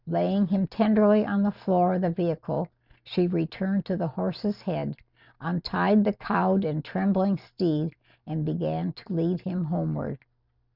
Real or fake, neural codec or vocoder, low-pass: real; none; 5.4 kHz